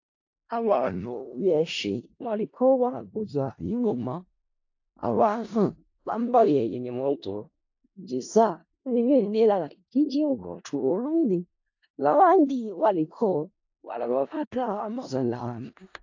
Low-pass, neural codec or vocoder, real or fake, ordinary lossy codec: 7.2 kHz; codec, 16 kHz in and 24 kHz out, 0.4 kbps, LongCat-Audio-Codec, four codebook decoder; fake; AAC, 48 kbps